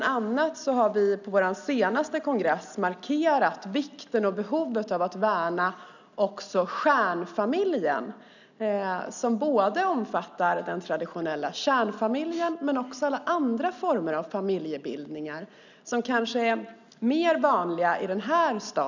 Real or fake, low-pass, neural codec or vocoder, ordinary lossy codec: real; 7.2 kHz; none; none